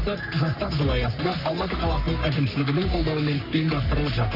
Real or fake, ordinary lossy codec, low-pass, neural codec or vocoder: fake; none; 5.4 kHz; codec, 44.1 kHz, 3.4 kbps, Pupu-Codec